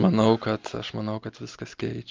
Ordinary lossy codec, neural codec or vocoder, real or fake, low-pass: Opus, 32 kbps; none; real; 7.2 kHz